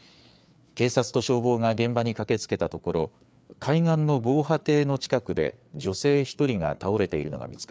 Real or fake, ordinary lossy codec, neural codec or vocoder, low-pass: fake; none; codec, 16 kHz, 4 kbps, FreqCodec, larger model; none